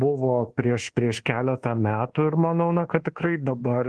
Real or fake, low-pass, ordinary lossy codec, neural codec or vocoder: fake; 10.8 kHz; Opus, 16 kbps; codec, 24 kHz, 1.2 kbps, DualCodec